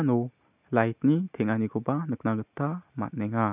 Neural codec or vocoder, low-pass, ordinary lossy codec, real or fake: none; 3.6 kHz; none; real